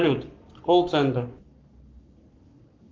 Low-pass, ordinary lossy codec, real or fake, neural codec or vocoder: 7.2 kHz; Opus, 24 kbps; fake; codec, 44.1 kHz, 7.8 kbps, Pupu-Codec